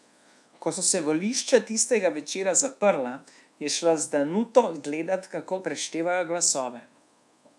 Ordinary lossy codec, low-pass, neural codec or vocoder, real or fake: none; none; codec, 24 kHz, 1.2 kbps, DualCodec; fake